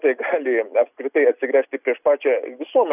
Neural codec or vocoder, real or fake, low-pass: none; real; 3.6 kHz